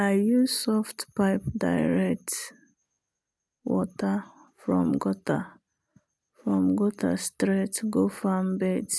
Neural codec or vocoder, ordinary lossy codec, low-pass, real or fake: none; none; none; real